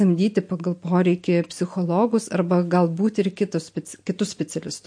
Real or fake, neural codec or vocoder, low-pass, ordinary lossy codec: real; none; 9.9 kHz; MP3, 48 kbps